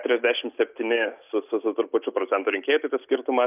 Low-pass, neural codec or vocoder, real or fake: 3.6 kHz; none; real